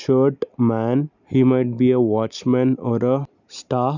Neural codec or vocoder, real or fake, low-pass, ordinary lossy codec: none; real; 7.2 kHz; none